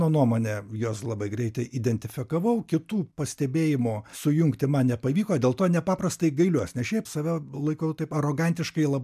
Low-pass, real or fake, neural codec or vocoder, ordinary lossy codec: 14.4 kHz; real; none; MP3, 96 kbps